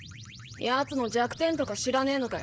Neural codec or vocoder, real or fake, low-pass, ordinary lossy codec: codec, 16 kHz, 8 kbps, FreqCodec, smaller model; fake; none; none